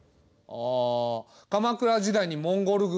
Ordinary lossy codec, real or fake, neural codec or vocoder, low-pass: none; real; none; none